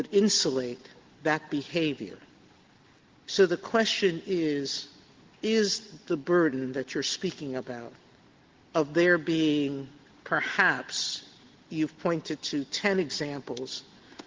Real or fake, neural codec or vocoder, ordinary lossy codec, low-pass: real; none; Opus, 16 kbps; 7.2 kHz